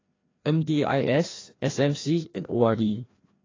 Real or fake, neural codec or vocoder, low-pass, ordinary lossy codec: fake; codec, 16 kHz, 1 kbps, FreqCodec, larger model; 7.2 kHz; AAC, 32 kbps